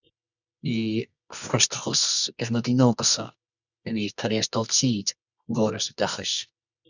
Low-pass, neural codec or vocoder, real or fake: 7.2 kHz; codec, 24 kHz, 0.9 kbps, WavTokenizer, medium music audio release; fake